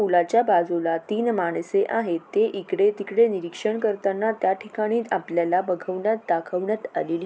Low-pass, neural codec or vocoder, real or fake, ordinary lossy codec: none; none; real; none